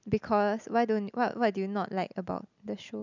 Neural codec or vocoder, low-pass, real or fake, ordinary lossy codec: none; 7.2 kHz; real; none